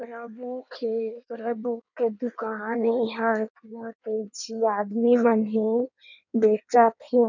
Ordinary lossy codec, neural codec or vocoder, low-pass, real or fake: none; codec, 16 kHz in and 24 kHz out, 1.1 kbps, FireRedTTS-2 codec; 7.2 kHz; fake